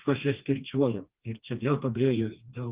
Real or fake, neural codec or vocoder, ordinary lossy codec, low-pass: fake; codec, 16 kHz, 2 kbps, FreqCodec, smaller model; Opus, 32 kbps; 3.6 kHz